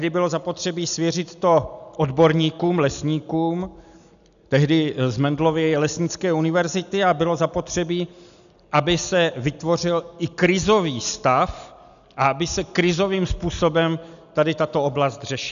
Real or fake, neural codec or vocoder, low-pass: real; none; 7.2 kHz